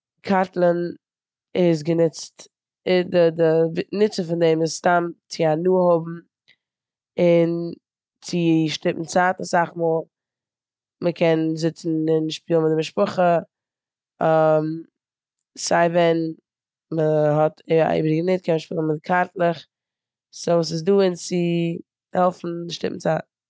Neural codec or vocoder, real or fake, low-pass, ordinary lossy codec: none; real; none; none